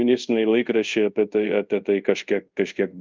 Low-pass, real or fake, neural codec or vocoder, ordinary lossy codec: 7.2 kHz; fake; codec, 24 kHz, 0.5 kbps, DualCodec; Opus, 24 kbps